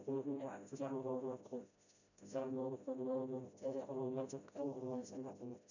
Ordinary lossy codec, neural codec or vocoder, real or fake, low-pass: none; codec, 16 kHz, 0.5 kbps, FreqCodec, smaller model; fake; 7.2 kHz